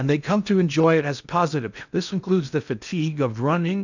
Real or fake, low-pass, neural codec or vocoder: fake; 7.2 kHz; codec, 16 kHz in and 24 kHz out, 0.6 kbps, FocalCodec, streaming, 4096 codes